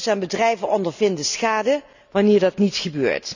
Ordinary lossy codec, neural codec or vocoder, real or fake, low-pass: none; none; real; 7.2 kHz